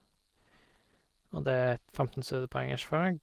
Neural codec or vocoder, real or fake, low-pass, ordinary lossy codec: none; real; 14.4 kHz; Opus, 16 kbps